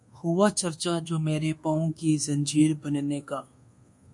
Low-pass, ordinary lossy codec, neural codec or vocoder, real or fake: 10.8 kHz; MP3, 48 kbps; codec, 24 kHz, 1.2 kbps, DualCodec; fake